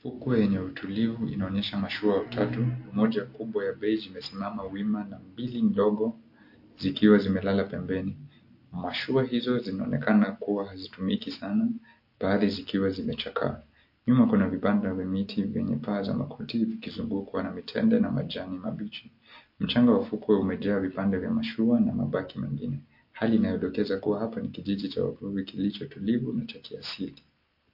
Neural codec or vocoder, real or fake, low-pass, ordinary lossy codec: none; real; 5.4 kHz; MP3, 32 kbps